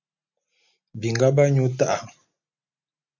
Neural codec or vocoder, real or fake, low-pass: none; real; 7.2 kHz